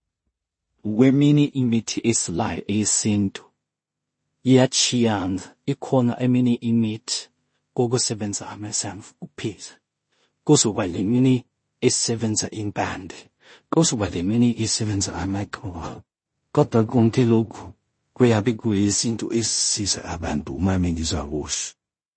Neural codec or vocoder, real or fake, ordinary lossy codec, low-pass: codec, 16 kHz in and 24 kHz out, 0.4 kbps, LongCat-Audio-Codec, two codebook decoder; fake; MP3, 32 kbps; 9.9 kHz